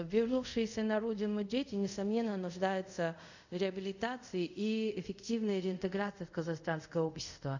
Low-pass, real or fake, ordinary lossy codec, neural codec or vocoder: 7.2 kHz; fake; none; codec, 24 kHz, 0.5 kbps, DualCodec